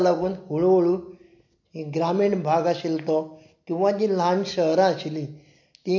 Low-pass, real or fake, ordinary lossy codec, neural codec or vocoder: 7.2 kHz; real; MP3, 48 kbps; none